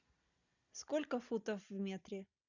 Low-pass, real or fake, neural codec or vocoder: 7.2 kHz; real; none